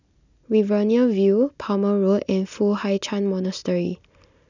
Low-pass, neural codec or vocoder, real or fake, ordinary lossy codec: 7.2 kHz; none; real; none